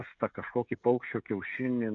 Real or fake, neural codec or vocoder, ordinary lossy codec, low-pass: fake; codec, 16 kHz, 16 kbps, FreqCodec, smaller model; MP3, 64 kbps; 7.2 kHz